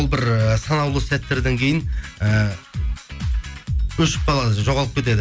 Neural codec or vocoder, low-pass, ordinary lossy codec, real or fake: none; none; none; real